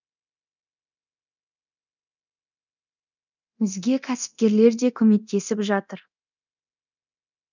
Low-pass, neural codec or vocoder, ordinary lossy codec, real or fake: 7.2 kHz; codec, 24 kHz, 0.9 kbps, DualCodec; none; fake